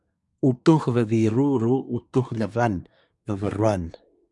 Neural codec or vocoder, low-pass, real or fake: codec, 24 kHz, 1 kbps, SNAC; 10.8 kHz; fake